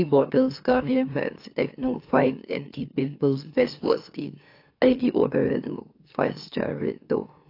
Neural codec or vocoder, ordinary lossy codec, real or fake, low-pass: autoencoder, 44.1 kHz, a latent of 192 numbers a frame, MeloTTS; AAC, 32 kbps; fake; 5.4 kHz